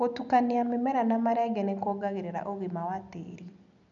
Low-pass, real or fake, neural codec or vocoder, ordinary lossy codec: 7.2 kHz; real; none; none